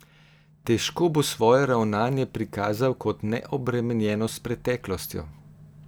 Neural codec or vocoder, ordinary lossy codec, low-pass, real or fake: none; none; none; real